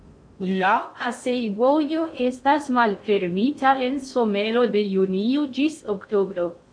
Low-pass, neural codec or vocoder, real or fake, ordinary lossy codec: 9.9 kHz; codec, 16 kHz in and 24 kHz out, 0.6 kbps, FocalCodec, streaming, 2048 codes; fake; MP3, 64 kbps